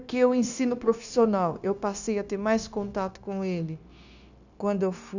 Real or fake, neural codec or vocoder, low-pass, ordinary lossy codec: fake; codec, 16 kHz, 0.9 kbps, LongCat-Audio-Codec; 7.2 kHz; none